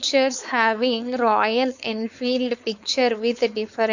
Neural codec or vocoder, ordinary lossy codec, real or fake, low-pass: codec, 16 kHz, 4.8 kbps, FACodec; none; fake; 7.2 kHz